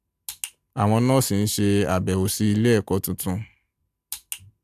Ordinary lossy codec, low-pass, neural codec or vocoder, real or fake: AAC, 96 kbps; 14.4 kHz; none; real